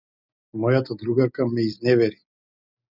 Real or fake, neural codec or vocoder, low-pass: real; none; 5.4 kHz